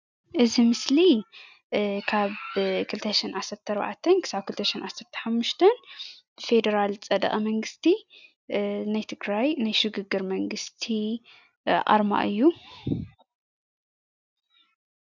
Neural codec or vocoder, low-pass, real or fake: none; 7.2 kHz; real